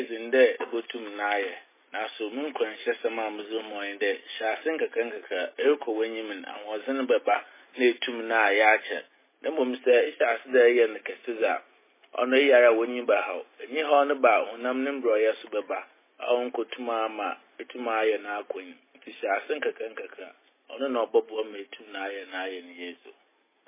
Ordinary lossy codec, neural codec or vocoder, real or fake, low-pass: MP3, 16 kbps; none; real; 3.6 kHz